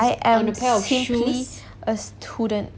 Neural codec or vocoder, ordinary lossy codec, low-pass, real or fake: none; none; none; real